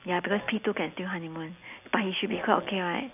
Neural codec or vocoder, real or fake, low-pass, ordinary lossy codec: none; real; 3.6 kHz; AAC, 32 kbps